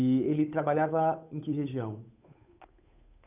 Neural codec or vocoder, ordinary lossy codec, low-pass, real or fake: codec, 16 kHz, 8 kbps, FunCodec, trained on Chinese and English, 25 frames a second; none; 3.6 kHz; fake